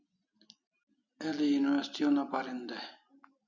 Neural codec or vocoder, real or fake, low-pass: none; real; 7.2 kHz